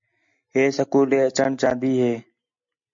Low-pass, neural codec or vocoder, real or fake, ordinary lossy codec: 7.2 kHz; none; real; MP3, 48 kbps